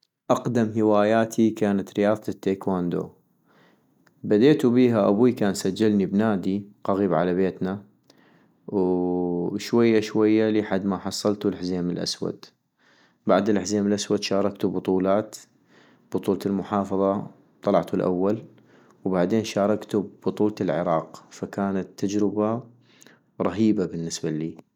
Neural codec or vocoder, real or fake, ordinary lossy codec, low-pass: none; real; none; 19.8 kHz